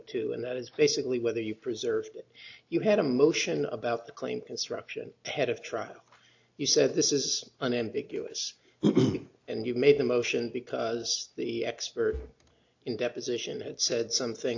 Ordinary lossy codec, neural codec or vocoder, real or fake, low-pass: AAC, 48 kbps; none; real; 7.2 kHz